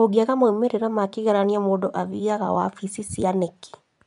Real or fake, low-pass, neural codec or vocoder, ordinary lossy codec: fake; 10.8 kHz; vocoder, 24 kHz, 100 mel bands, Vocos; none